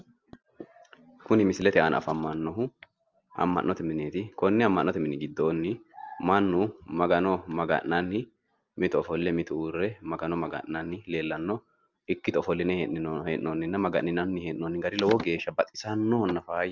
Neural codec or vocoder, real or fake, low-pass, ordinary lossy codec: none; real; 7.2 kHz; Opus, 24 kbps